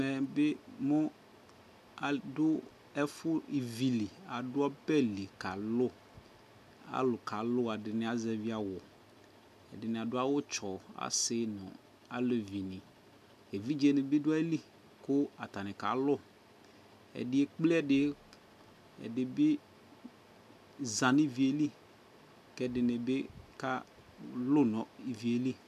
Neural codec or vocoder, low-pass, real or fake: none; 14.4 kHz; real